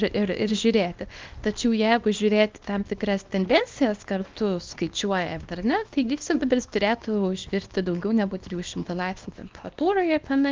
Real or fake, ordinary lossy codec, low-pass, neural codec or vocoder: fake; Opus, 32 kbps; 7.2 kHz; codec, 24 kHz, 0.9 kbps, WavTokenizer, small release